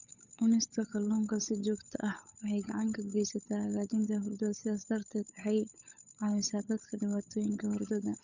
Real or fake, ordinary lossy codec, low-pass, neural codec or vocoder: fake; none; 7.2 kHz; codec, 16 kHz, 8 kbps, FunCodec, trained on Chinese and English, 25 frames a second